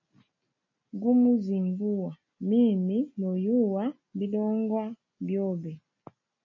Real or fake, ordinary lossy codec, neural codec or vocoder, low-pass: real; MP3, 32 kbps; none; 7.2 kHz